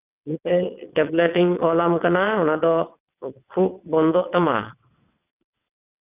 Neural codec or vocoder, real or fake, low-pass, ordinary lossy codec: vocoder, 22.05 kHz, 80 mel bands, WaveNeXt; fake; 3.6 kHz; none